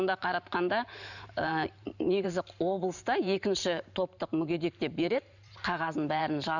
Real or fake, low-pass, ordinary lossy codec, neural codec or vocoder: fake; 7.2 kHz; none; vocoder, 44.1 kHz, 128 mel bands every 512 samples, BigVGAN v2